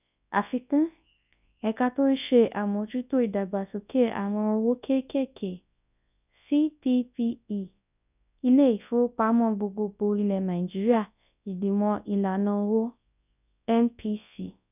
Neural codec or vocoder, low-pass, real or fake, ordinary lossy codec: codec, 24 kHz, 0.9 kbps, WavTokenizer, large speech release; 3.6 kHz; fake; none